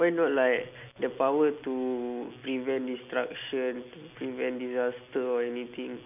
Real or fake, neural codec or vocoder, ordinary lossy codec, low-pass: real; none; none; 3.6 kHz